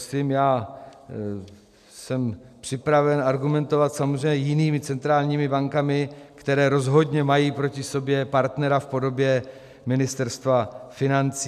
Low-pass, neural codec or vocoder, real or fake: 14.4 kHz; none; real